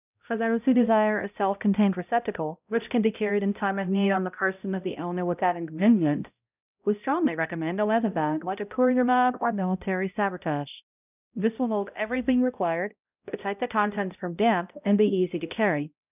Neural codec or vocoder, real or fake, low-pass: codec, 16 kHz, 0.5 kbps, X-Codec, HuBERT features, trained on balanced general audio; fake; 3.6 kHz